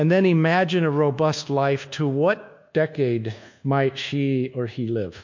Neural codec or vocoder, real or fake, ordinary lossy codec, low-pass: codec, 24 kHz, 1.2 kbps, DualCodec; fake; MP3, 48 kbps; 7.2 kHz